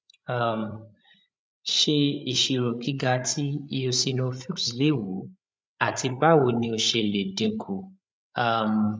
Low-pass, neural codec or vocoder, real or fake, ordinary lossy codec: none; codec, 16 kHz, 8 kbps, FreqCodec, larger model; fake; none